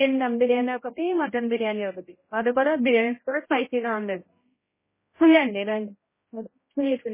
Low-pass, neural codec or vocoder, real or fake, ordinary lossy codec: 3.6 kHz; codec, 16 kHz, 0.5 kbps, X-Codec, HuBERT features, trained on general audio; fake; MP3, 16 kbps